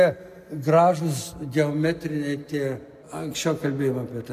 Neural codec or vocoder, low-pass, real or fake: none; 14.4 kHz; real